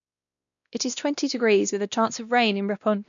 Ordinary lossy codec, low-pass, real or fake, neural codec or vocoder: none; 7.2 kHz; fake; codec, 16 kHz, 1 kbps, X-Codec, WavLM features, trained on Multilingual LibriSpeech